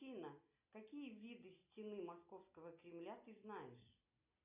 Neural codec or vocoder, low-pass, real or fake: none; 3.6 kHz; real